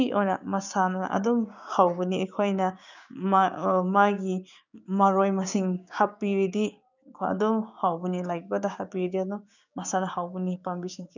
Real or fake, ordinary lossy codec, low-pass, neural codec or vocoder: fake; none; 7.2 kHz; codec, 16 kHz, 6 kbps, DAC